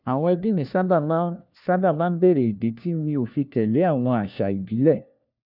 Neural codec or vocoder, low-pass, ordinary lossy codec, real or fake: codec, 16 kHz, 1 kbps, FunCodec, trained on LibriTTS, 50 frames a second; 5.4 kHz; none; fake